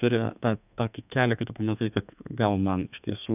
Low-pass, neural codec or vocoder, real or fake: 3.6 kHz; codec, 32 kHz, 1.9 kbps, SNAC; fake